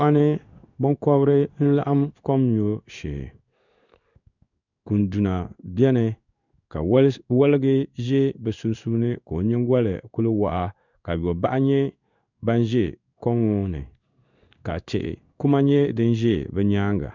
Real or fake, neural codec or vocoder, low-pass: fake; codec, 16 kHz in and 24 kHz out, 1 kbps, XY-Tokenizer; 7.2 kHz